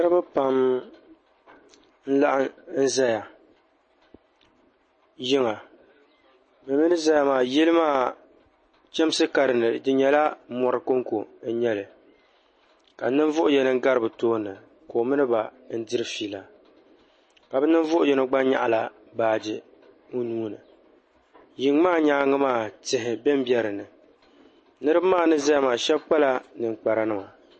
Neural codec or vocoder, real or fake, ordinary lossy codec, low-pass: none; real; MP3, 32 kbps; 9.9 kHz